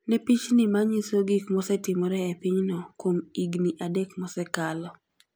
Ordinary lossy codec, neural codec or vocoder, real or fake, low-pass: none; none; real; none